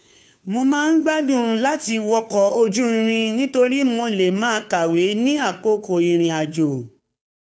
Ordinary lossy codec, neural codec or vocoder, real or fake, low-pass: none; codec, 16 kHz, 2 kbps, FunCodec, trained on Chinese and English, 25 frames a second; fake; none